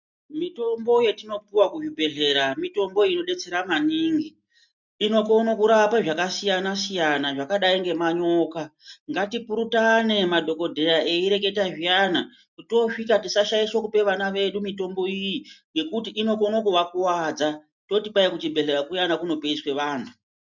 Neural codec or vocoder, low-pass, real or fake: none; 7.2 kHz; real